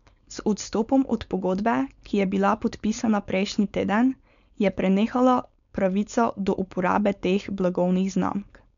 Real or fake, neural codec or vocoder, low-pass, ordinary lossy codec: fake; codec, 16 kHz, 4.8 kbps, FACodec; 7.2 kHz; none